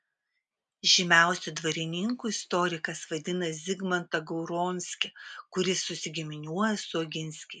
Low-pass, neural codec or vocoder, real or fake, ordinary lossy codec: 10.8 kHz; none; real; MP3, 96 kbps